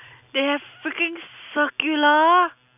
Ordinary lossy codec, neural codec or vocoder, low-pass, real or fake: none; none; 3.6 kHz; real